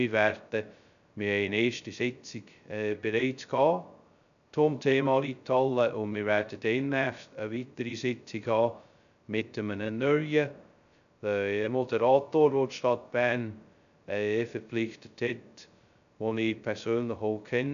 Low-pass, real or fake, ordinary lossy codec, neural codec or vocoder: 7.2 kHz; fake; none; codec, 16 kHz, 0.2 kbps, FocalCodec